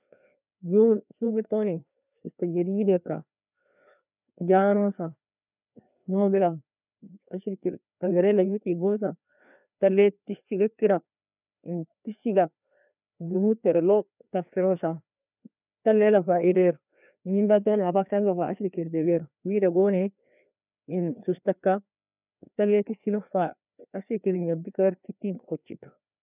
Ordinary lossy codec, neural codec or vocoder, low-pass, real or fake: none; codec, 16 kHz, 2 kbps, FreqCodec, larger model; 3.6 kHz; fake